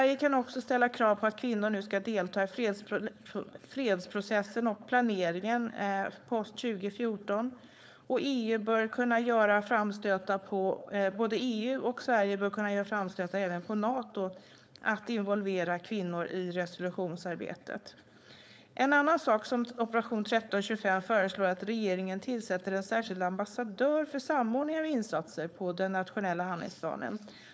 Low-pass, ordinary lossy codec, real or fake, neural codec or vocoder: none; none; fake; codec, 16 kHz, 4.8 kbps, FACodec